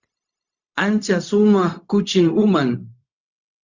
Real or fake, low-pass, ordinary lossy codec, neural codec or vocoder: fake; 7.2 kHz; Opus, 64 kbps; codec, 16 kHz, 0.4 kbps, LongCat-Audio-Codec